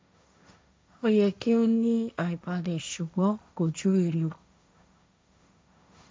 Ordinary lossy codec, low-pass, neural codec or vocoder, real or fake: none; none; codec, 16 kHz, 1.1 kbps, Voila-Tokenizer; fake